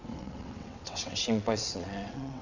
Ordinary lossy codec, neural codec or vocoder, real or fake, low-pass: none; vocoder, 22.05 kHz, 80 mel bands, WaveNeXt; fake; 7.2 kHz